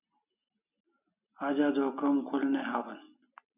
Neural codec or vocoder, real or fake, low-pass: none; real; 3.6 kHz